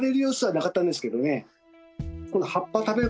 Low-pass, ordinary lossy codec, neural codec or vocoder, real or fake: none; none; none; real